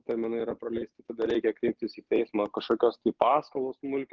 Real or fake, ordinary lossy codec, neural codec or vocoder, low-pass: real; Opus, 32 kbps; none; 7.2 kHz